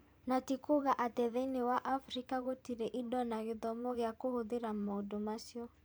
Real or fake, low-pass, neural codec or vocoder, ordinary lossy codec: fake; none; vocoder, 44.1 kHz, 128 mel bands every 512 samples, BigVGAN v2; none